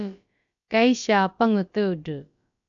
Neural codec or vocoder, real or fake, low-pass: codec, 16 kHz, about 1 kbps, DyCAST, with the encoder's durations; fake; 7.2 kHz